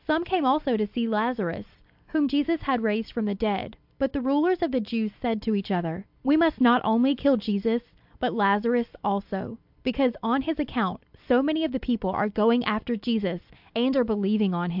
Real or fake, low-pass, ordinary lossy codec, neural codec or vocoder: real; 5.4 kHz; AAC, 48 kbps; none